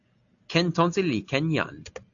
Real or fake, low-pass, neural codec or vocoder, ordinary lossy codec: real; 7.2 kHz; none; AAC, 48 kbps